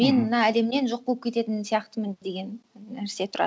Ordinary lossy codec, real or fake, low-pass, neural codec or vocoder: none; real; none; none